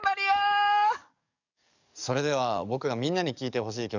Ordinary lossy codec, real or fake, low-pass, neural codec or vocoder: none; fake; 7.2 kHz; codec, 44.1 kHz, 7.8 kbps, DAC